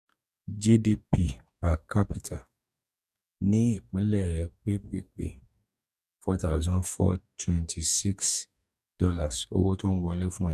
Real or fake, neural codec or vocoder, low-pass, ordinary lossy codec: fake; codec, 44.1 kHz, 2.6 kbps, DAC; 14.4 kHz; none